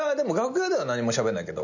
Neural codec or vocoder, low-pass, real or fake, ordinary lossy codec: none; 7.2 kHz; real; none